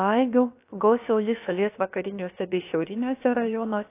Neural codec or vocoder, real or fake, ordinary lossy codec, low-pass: codec, 16 kHz, about 1 kbps, DyCAST, with the encoder's durations; fake; AAC, 24 kbps; 3.6 kHz